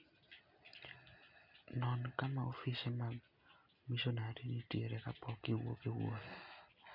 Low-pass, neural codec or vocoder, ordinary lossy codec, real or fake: 5.4 kHz; none; none; real